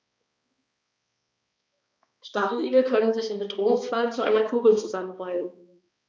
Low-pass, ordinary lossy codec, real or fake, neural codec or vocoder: none; none; fake; codec, 16 kHz, 2 kbps, X-Codec, HuBERT features, trained on balanced general audio